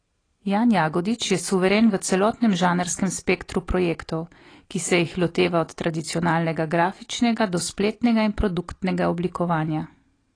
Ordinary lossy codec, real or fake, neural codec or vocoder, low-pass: AAC, 32 kbps; real; none; 9.9 kHz